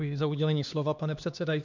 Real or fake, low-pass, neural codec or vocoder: fake; 7.2 kHz; codec, 16 kHz, 2 kbps, X-Codec, HuBERT features, trained on LibriSpeech